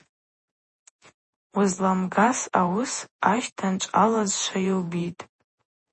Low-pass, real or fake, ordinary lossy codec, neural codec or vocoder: 10.8 kHz; fake; MP3, 32 kbps; vocoder, 48 kHz, 128 mel bands, Vocos